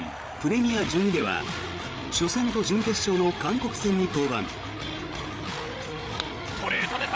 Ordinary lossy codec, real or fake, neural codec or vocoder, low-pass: none; fake; codec, 16 kHz, 8 kbps, FreqCodec, larger model; none